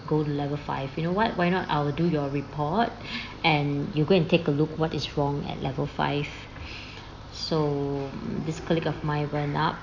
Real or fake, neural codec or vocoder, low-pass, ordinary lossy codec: real; none; 7.2 kHz; AAC, 48 kbps